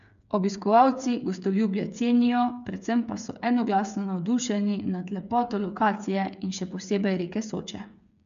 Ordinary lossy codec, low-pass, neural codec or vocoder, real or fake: none; 7.2 kHz; codec, 16 kHz, 8 kbps, FreqCodec, smaller model; fake